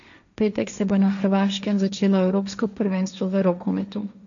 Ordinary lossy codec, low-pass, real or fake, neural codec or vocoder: none; 7.2 kHz; fake; codec, 16 kHz, 1.1 kbps, Voila-Tokenizer